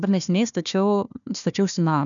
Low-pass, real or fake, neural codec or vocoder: 7.2 kHz; fake; codec, 16 kHz, 1 kbps, FunCodec, trained on Chinese and English, 50 frames a second